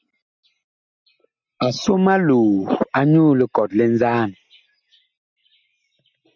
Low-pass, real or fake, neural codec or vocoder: 7.2 kHz; real; none